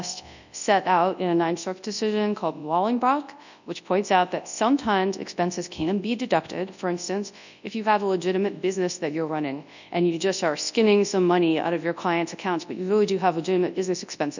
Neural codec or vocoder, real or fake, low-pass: codec, 24 kHz, 0.9 kbps, WavTokenizer, large speech release; fake; 7.2 kHz